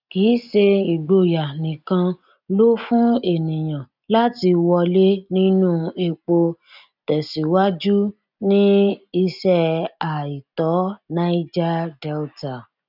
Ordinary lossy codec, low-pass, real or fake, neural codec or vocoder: none; 5.4 kHz; real; none